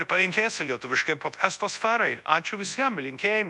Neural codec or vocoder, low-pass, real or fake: codec, 24 kHz, 0.9 kbps, WavTokenizer, large speech release; 10.8 kHz; fake